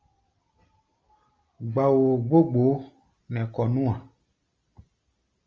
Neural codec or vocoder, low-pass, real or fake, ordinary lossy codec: none; 7.2 kHz; real; Opus, 32 kbps